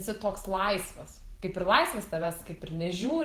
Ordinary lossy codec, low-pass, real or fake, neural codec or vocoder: Opus, 16 kbps; 14.4 kHz; fake; vocoder, 44.1 kHz, 128 mel bands every 512 samples, BigVGAN v2